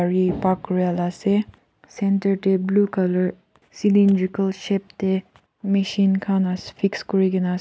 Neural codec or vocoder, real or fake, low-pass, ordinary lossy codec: none; real; none; none